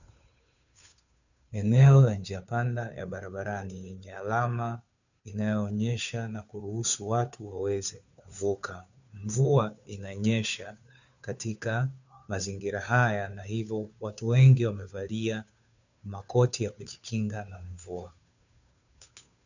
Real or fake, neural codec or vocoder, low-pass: fake; codec, 16 kHz, 2 kbps, FunCodec, trained on Chinese and English, 25 frames a second; 7.2 kHz